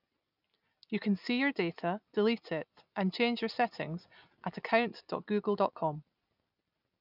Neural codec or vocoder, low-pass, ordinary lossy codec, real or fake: none; 5.4 kHz; none; real